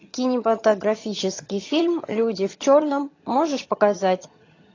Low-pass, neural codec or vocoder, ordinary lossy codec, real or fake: 7.2 kHz; vocoder, 22.05 kHz, 80 mel bands, HiFi-GAN; AAC, 32 kbps; fake